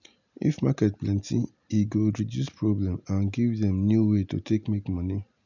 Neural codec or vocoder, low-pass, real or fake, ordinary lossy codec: none; 7.2 kHz; real; none